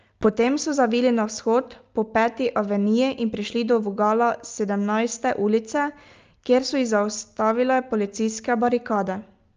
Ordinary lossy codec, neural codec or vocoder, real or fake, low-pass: Opus, 24 kbps; none; real; 7.2 kHz